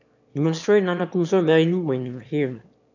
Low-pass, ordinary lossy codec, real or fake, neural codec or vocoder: 7.2 kHz; none; fake; autoencoder, 22.05 kHz, a latent of 192 numbers a frame, VITS, trained on one speaker